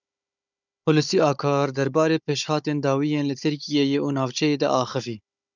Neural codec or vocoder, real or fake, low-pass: codec, 16 kHz, 16 kbps, FunCodec, trained on Chinese and English, 50 frames a second; fake; 7.2 kHz